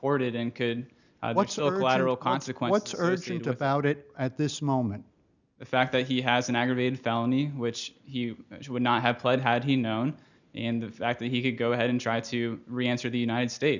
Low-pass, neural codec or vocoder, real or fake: 7.2 kHz; none; real